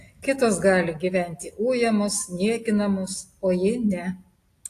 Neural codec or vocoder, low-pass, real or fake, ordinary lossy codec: none; 14.4 kHz; real; AAC, 48 kbps